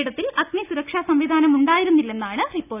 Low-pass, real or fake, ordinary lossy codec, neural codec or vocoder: 3.6 kHz; real; none; none